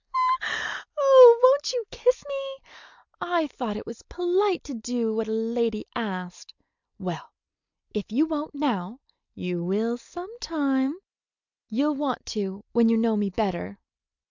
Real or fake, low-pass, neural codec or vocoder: real; 7.2 kHz; none